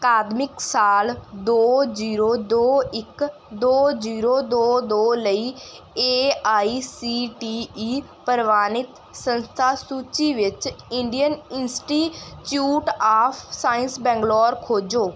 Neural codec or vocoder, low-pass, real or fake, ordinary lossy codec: none; none; real; none